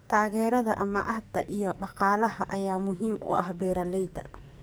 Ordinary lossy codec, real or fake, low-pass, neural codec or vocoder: none; fake; none; codec, 44.1 kHz, 2.6 kbps, SNAC